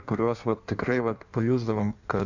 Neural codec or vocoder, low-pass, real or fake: codec, 16 kHz in and 24 kHz out, 1.1 kbps, FireRedTTS-2 codec; 7.2 kHz; fake